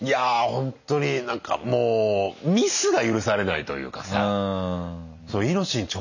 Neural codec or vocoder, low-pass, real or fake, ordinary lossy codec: none; 7.2 kHz; real; none